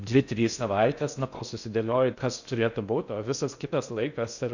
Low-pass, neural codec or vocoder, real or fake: 7.2 kHz; codec, 16 kHz in and 24 kHz out, 0.6 kbps, FocalCodec, streaming, 4096 codes; fake